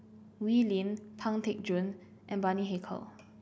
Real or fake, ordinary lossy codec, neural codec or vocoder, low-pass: real; none; none; none